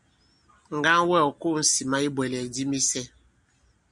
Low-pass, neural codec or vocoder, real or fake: 10.8 kHz; none; real